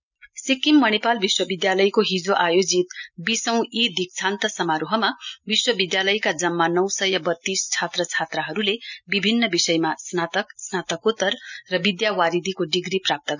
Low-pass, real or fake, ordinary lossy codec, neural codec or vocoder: 7.2 kHz; real; none; none